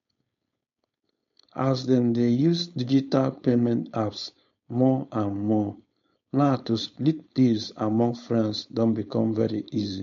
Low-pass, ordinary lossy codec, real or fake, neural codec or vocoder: 7.2 kHz; MP3, 48 kbps; fake; codec, 16 kHz, 4.8 kbps, FACodec